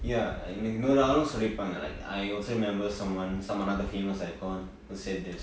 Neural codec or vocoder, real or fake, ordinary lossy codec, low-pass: none; real; none; none